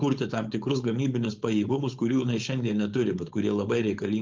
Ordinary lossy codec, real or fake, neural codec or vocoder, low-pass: Opus, 32 kbps; fake; codec, 16 kHz, 4.8 kbps, FACodec; 7.2 kHz